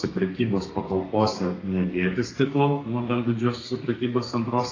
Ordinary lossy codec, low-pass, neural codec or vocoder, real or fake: AAC, 32 kbps; 7.2 kHz; codec, 32 kHz, 1.9 kbps, SNAC; fake